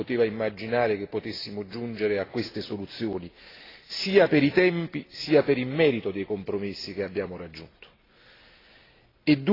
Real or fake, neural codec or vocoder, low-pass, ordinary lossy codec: real; none; 5.4 kHz; AAC, 24 kbps